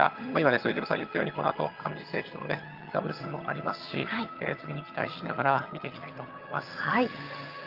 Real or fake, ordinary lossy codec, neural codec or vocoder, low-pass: fake; Opus, 24 kbps; vocoder, 22.05 kHz, 80 mel bands, HiFi-GAN; 5.4 kHz